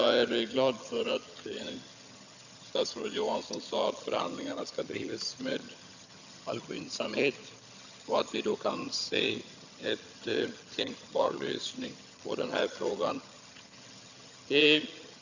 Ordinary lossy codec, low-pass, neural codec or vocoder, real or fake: none; 7.2 kHz; vocoder, 22.05 kHz, 80 mel bands, HiFi-GAN; fake